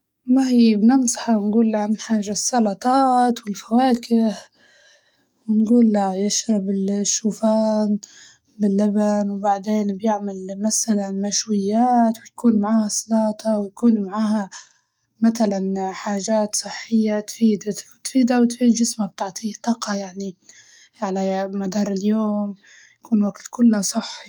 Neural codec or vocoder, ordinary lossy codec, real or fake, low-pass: codec, 44.1 kHz, 7.8 kbps, DAC; none; fake; 19.8 kHz